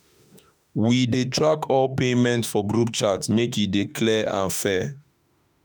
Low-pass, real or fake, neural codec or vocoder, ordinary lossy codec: none; fake; autoencoder, 48 kHz, 32 numbers a frame, DAC-VAE, trained on Japanese speech; none